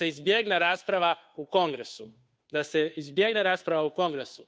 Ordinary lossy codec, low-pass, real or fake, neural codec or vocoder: none; none; fake; codec, 16 kHz, 2 kbps, FunCodec, trained on Chinese and English, 25 frames a second